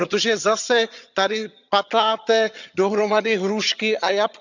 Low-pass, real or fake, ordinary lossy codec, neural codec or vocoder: 7.2 kHz; fake; none; vocoder, 22.05 kHz, 80 mel bands, HiFi-GAN